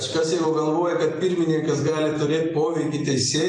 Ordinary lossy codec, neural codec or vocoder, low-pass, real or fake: AAC, 32 kbps; vocoder, 44.1 kHz, 128 mel bands every 256 samples, BigVGAN v2; 10.8 kHz; fake